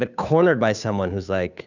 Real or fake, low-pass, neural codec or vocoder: fake; 7.2 kHz; codec, 16 kHz, 2 kbps, FunCodec, trained on Chinese and English, 25 frames a second